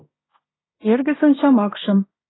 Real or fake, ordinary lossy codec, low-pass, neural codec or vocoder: fake; AAC, 16 kbps; 7.2 kHz; codec, 16 kHz in and 24 kHz out, 0.9 kbps, LongCat-Audio-Codec, fine tuned four codebook decoder